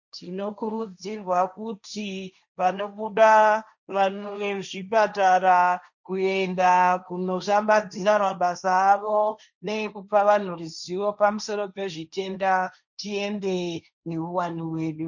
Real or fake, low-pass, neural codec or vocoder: fake; 7.2 kHz; codec, 16 kHz, 1.1 kbps, Voila-Tokenizer